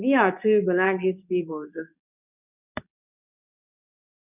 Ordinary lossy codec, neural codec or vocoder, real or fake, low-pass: none; codec, 24 kHz, 0.9 kbps, WavTokenizer, medium speech release version 2; fake; 3.6 kHz